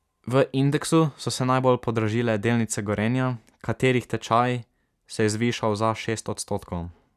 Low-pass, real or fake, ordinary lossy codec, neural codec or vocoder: 14.4 kHz; real; none; none